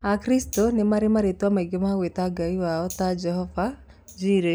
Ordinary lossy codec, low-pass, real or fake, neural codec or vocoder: none; none; real; none